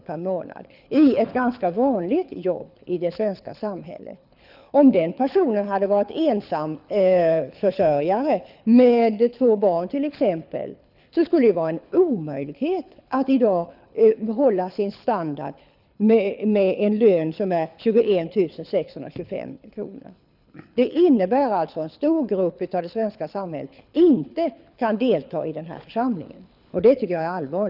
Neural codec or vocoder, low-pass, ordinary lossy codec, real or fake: codec, 24 kHz, 6 kbps, HILCodec; 5.4 kHz; none; fake